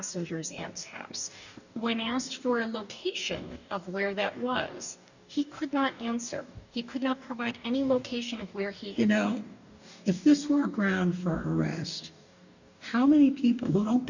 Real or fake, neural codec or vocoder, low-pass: fake; codec, 44.1 kHz, 2.6 kbps, DAC; 7.2 kHz